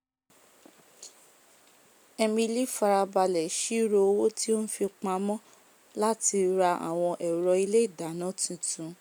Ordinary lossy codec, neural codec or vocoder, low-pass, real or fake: none; none; none; real